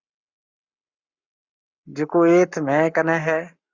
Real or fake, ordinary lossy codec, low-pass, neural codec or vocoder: fake; Opus, 64 kbps; 7.2 kHz; codec, 44.1 kHz, 7.8 kbps, Pupu-Codec